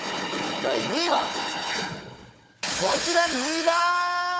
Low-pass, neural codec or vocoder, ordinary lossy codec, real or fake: none; codec, 16 kHz, 4 kbps, FunCodec, trained on Chinese and English, 50 frames a second; none; fake